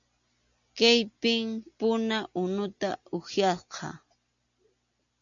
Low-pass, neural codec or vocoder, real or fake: 7.2 kHz; none; real